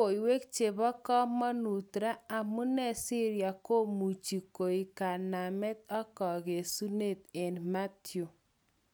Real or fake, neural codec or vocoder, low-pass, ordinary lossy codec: real; none; none; none